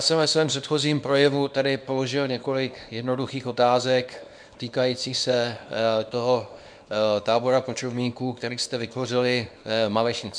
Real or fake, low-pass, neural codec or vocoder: fake; 9.9 kHz; codec, 24 kHz, 0.9 kbps, WavTokenizer, small release